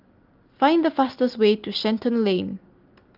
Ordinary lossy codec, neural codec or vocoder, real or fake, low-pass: Opus, 32 kbps; none; real; 5.4 kHz